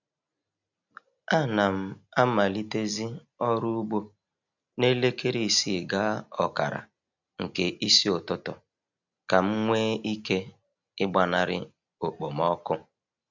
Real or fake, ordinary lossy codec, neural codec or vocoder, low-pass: real; none; none; 7.2 kHz